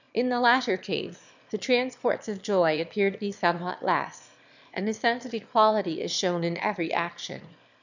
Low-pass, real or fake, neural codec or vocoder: 7.2 kHz; fake; autoencoder, 22.05 kHz, a latent of 192 numbers a frame, VITS, trained on one speaker